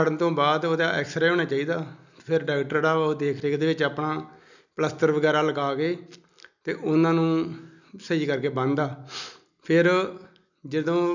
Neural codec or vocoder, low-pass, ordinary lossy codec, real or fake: none; 7.2 kHz; none; real